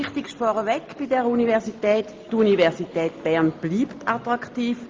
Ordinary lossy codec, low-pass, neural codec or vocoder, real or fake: Opus, 32 kbps; 7.2 kHz; none; real